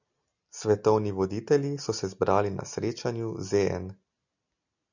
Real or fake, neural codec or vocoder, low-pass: real; none; 7.2 kHz